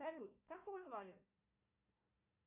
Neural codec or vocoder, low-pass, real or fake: codec, 16 kHz, 1 kbps, FunCodec, trained on Chinese and English, 50 frames a second; 3.6 kHz; fake